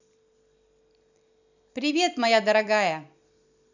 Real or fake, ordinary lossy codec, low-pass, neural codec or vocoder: fake; none; 7.2 kHz; vocoder, 44.1 kHz, 128 mel bands every 256 samples, BigVGAN v2